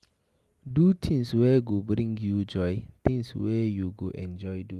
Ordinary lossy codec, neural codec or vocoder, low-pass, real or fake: Opus, 32 kbps; none; 14.4 kHz; real